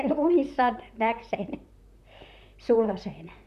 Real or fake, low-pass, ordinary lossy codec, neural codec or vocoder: fake; 14.4 kHz; none; vocoder, 44.1 kHz, 128 mel bands, Pupu-Vocoder